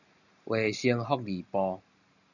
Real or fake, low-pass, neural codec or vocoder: real; 7.2 kHz; none